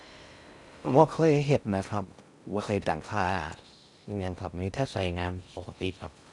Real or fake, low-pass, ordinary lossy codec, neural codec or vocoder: fake; 10.8 kHz; none; codec, 16 kHz in and 24 kHz out, 0.6 kbps, FocalCodec, streaming, 4096 codes